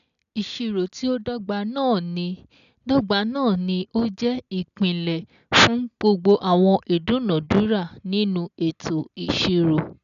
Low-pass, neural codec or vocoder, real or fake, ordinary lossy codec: 7.2 kHz; none; real; none